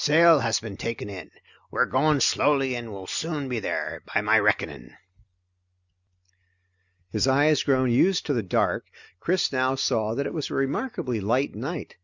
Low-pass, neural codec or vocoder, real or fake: 7.2 kHz; none; real